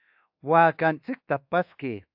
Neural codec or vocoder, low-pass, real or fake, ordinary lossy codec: codec, 24 kHz, 1.2 kbps, DualCodec; 5.4 kHz; fake; AAC, 48 kbps